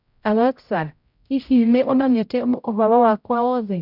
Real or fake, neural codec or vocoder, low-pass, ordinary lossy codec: fake; codec, 16 kHz, 0.5 kbps, X-Codec, HuBERT features, trained on general audio; 5.4 kHz; none